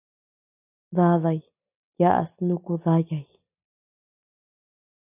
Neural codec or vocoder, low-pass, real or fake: none; 3.6 kHz; real